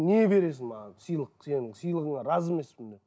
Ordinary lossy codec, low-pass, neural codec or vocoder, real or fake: none; none; none; real